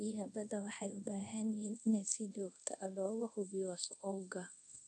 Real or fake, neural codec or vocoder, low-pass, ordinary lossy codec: fake; codec, 24 kHz, 0.5 kbps, DualCodec; none; none